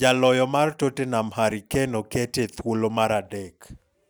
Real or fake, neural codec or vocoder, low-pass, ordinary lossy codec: real; none; none; none